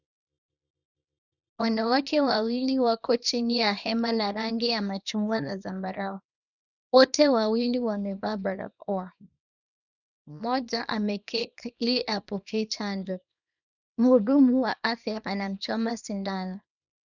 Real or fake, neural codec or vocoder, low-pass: fake; codec, 24 kHz, 0.9 kbps, WavTokenizer, small release; 7.2 kHz